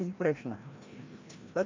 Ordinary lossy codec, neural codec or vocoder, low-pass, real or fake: MP3, 48 kbps; codec, 16 kHz, 1 kbps, FreqCodec, larger model; 7.2 kHz; fake